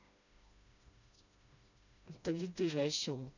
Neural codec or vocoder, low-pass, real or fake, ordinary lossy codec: codec, 16 kHz, 1 kbps, FreqCodec, smaller model; 7.2 kHz; fake; none